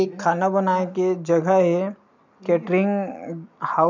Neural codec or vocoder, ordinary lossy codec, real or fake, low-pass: none; none; real; 7.2 kHz